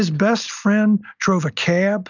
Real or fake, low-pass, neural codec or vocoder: real; 7.2 kHz; none